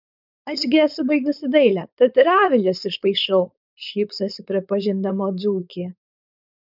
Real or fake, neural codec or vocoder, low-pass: fake; codec, 16 kHz, 4.8 kbps, FACodec; 5.4 kHz